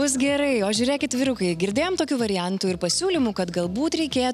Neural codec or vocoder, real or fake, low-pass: none; real; 14.4 kHz